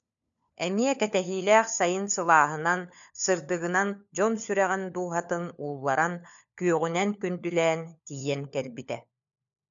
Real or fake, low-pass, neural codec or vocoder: fake; 7.2 kHz; codec, 16 kHz, 4 kbps, FunCodec, trained on LibriTTS, 50 frames a second